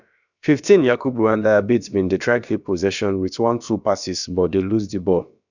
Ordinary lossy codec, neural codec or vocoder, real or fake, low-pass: none; codec, 16 kHz, about 1 kbps, DyCAST, with the encoder's durations; fake; 7.2 kHz